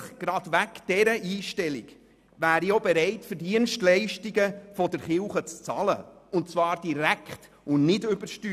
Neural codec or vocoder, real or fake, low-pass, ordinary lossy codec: none; real; 14.4 kHz; none